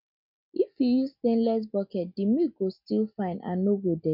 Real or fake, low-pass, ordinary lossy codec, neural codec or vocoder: real; 5.4 kHz; none; none